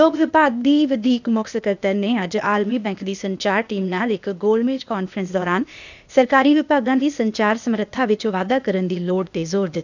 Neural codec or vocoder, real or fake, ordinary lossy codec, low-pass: codec, 16 kHz, 0.8 kbps, ZipCodec; fake; none; 7.2 kHz